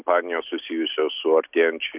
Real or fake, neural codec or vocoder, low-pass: real; none; 3.6 kHz